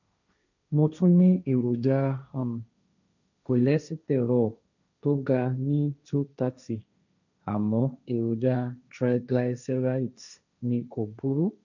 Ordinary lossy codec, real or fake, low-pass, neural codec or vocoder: none; fake; 7.2 kHz; codec, 16 kHz, 1.1 kbps, Voila-Tokenizer